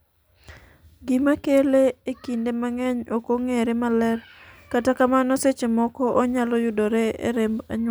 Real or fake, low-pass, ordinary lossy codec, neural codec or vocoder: real; none; none; none